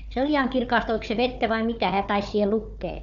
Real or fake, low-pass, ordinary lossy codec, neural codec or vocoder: fake; 7.2 kHz; MP3, 96 kbps; codec, 16 kHz, 4 kbps, FunCodec, trained on Chinese and English, 50 frames a second